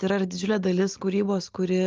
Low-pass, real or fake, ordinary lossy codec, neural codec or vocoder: 7.2 kHz; real; Opus, 32 kbps; none